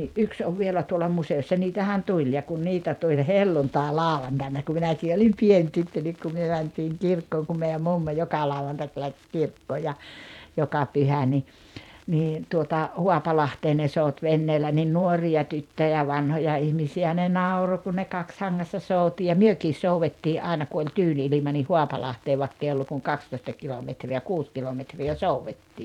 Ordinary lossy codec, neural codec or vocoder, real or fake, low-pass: none; vocoder, 44.1 kHz, 128 mel bands every 512 samples, BigVGAN v2; fake; 19.8 kHz